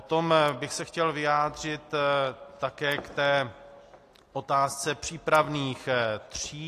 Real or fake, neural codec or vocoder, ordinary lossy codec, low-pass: real; none; AAC, 48 kbps; 14.4 kHz